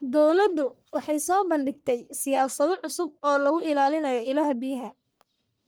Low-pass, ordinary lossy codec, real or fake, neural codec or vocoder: none; none; fake; codec, 44.1 kHz, 1.7 kbps, Pupu-Codec